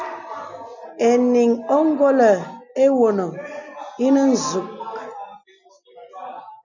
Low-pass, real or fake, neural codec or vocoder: 7.2 kHz; real; none